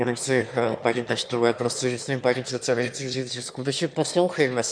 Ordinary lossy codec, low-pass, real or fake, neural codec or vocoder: MP3, 96 kbps; 9.9 kHz; fake; autoencoder, 22.05 kHz, a latent of 192 numbers a frame, VITS, trained on one speaker